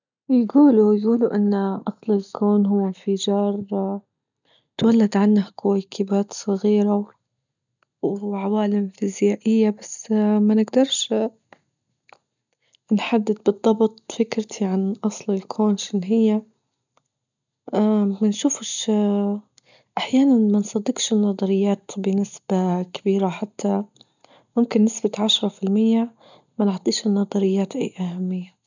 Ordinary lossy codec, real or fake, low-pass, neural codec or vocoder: none; real; 7.2 kHz; none